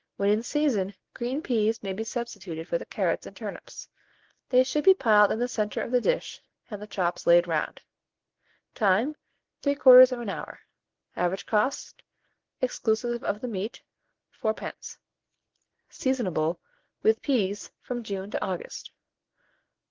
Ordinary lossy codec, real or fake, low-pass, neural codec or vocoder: Opus, 16 kbps; real; 7.2 kHz; none